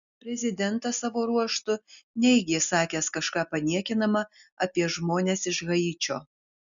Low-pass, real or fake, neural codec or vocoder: 7.2 kHz; real; none